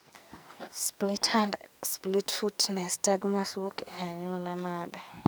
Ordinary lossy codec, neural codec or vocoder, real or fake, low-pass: none; codec, 44.1 kHz, 2.6 kbps, SNAC; fake; none